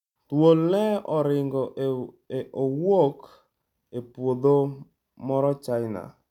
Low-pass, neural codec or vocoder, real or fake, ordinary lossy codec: 19.8 kHz; none; real; none